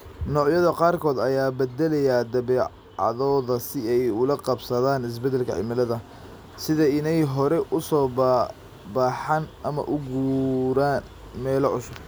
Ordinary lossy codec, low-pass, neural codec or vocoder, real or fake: none; none; none; real